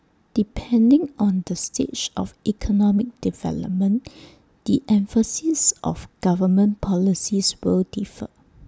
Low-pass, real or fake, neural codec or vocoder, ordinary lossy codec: none; real; none; none